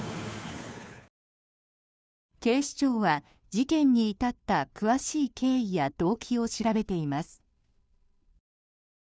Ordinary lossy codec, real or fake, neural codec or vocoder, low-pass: none; fake; codec, 16 kHz, 2 kbps, FunCodec, trained on Chinese and English, 25 frames a second; none